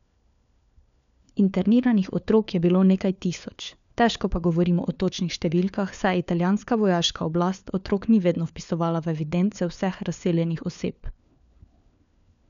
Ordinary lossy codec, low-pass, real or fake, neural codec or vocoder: none; 7.2 kHz; fake; codec, 16 kHz, 4 kbps, FunCodec, trained on LibriTTS, 50 frames a second